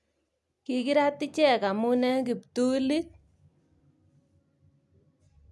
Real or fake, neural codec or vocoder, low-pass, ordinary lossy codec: real; none; none; none